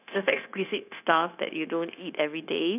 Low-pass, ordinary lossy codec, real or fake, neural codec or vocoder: 3.6 kHz; none; fake; codec, 16 kHz, 0.9 kbps, LongCat-Audio-Codec